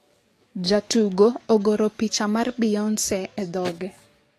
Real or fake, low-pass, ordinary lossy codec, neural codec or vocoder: fake; 14.4 kHz; AAC, 48 kbps; codec, 44.1 kHz, 7.8 kbps, DAC